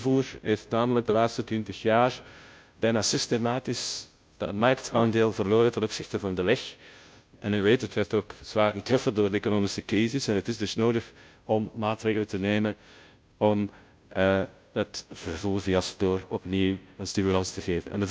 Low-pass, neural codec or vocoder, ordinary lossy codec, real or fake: none; codec, 16 kHz, 0.5 kbps, FunCodec, trained on Chinese and English, 25 frames a second; none; fake